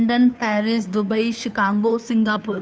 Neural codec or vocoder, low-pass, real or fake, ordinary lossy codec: codec, 16 kHz, 2 kbps, FunCodec, trained on Chinese and English, 25 frames a second; none; fake; none